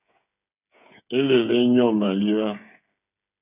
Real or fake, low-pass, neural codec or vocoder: fake; 3.6 kHz; codec, 16 kHz, 8 kbps, FreqCodec, smaller model